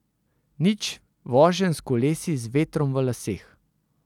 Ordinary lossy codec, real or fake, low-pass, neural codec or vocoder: none; real; 19.8 kHz; none